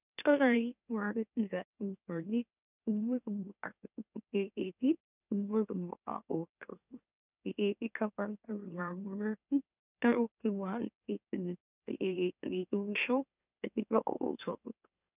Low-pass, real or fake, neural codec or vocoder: 3.6 kHz; fake; autoencoder, 44.1 kHz, a latent of 192 numbers a frame, MeloTTS